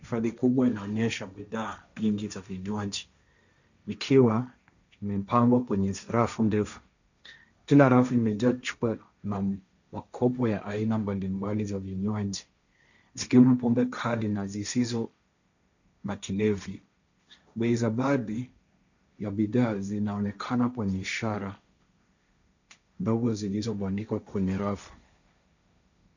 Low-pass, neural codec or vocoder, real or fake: 7.2 kHz; codec, 16 kHz, 1.1 kbps, Voila-Tokenizer; fake